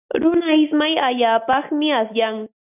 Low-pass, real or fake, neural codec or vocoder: 3.6 kHz; real; none